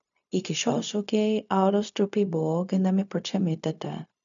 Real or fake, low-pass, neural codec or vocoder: fake; 7.2 kHz; codec, 16 kHz, 0.4 kbps, LongCat-Audio-Codec